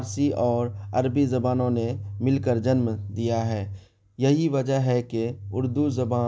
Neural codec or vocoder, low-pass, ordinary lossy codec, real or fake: none; none; none; real